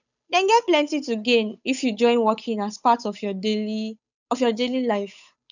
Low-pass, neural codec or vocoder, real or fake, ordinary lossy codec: 7.2 kHz; codec, 16 kHz, 8 kbps, FunCodec, trained on Chinese and English, 25 frames a second; fake; none